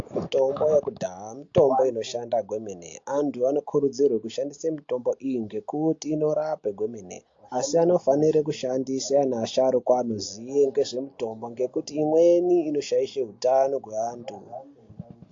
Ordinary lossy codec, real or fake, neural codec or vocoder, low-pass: AAC, 48 kbps; real; none; 7.2 kHz